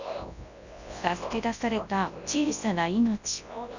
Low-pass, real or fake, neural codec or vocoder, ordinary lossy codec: 7.2 kHz; fake; codec, 24 kHz, 0.9 kbps, WavTokenizer, large speech release; none